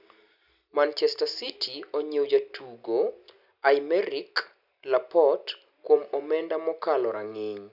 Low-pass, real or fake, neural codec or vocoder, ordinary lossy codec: 5.4 kHz; real; none; none